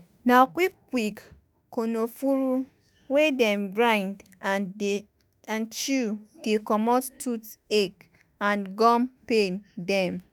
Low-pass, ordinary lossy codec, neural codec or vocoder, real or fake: none; none; autoencoder, 48 kHz, 32 numbers a frame, DAC-VAE, trained on Japanese speech; fake